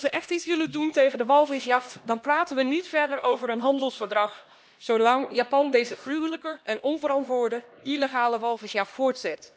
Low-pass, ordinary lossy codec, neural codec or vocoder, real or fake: none; none; codec, 16 kHz, 1 kbps, X-Codec, HuBERT features, trained on LibriSpeech; fake